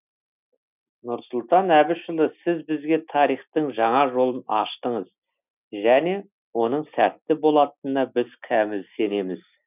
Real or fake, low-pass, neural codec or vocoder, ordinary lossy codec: real; 3.6 kHz; none; none